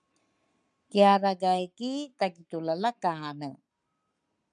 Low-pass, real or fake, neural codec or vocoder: 10.8 kHz; fake; codec, 44.1 kHz, 7.8 kbps, Pupu-Codec